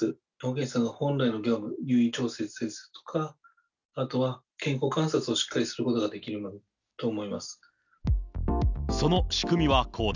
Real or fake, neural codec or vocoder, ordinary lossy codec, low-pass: real; none; none; 7.2 kHz